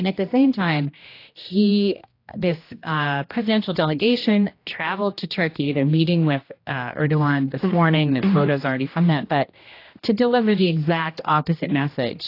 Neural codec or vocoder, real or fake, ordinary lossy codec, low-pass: codec, 16 kHz, 1 kbps, X-Codec, HuBERT features, trained on general audio; fake; AAC, 32 kbps; 5.4 kHz